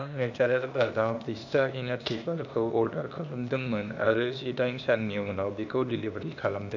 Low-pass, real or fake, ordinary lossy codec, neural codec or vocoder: 7.2 kHz; fake; none; codec, 16 kHz, 0.8 kbps, ZipCodec